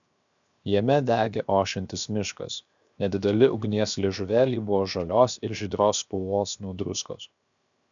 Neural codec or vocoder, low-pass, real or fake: codec, 16 kHz, 0.7 kbps, FocalCodec; 7.2 kHz; fake